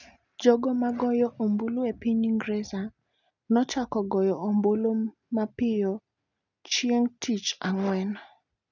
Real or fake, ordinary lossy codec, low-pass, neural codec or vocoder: real; none; 7.2 kHz; none